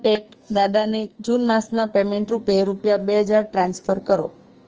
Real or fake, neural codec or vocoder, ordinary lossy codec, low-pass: fake; codec, 44.1 kHz, 2.6 kbps, SNAC; Opus, 24 kbps; 7.2 kHz